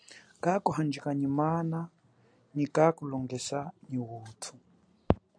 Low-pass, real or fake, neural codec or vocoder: 9.9 kHz; real; none